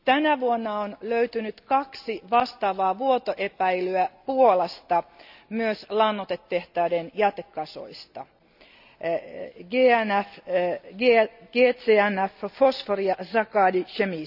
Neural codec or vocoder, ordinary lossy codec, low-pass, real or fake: none; none; 5.4 kHz; real